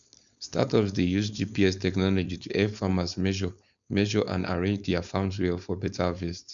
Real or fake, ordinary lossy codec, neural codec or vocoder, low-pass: fake; none; codec, 16 kHz, 4.8 kbps, FACodec; 7.2 kHz